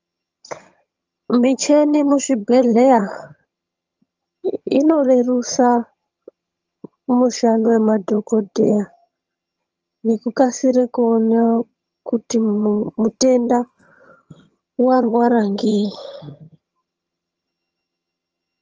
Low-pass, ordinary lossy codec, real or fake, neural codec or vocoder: 7.2 kHz; Opus, 24 kbps; fake; vocoder, 22.05 kHz, 80 mel bands, HiFi-GAN